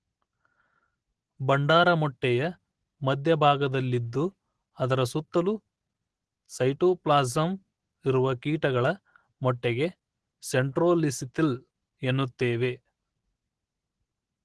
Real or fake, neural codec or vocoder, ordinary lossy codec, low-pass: real; none; Opus, 16 kbps; 10.8 kHz